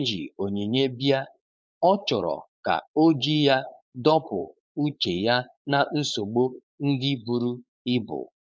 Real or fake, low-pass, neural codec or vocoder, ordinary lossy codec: fake; none; codec, 16 kHz, 4.8 kbps, FACodec; none